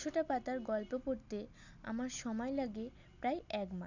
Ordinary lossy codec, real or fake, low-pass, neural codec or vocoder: none; real; 7.2 kHz; none